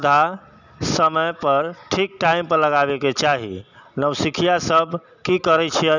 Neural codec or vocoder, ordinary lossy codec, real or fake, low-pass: none; none; real; 7.2 kHz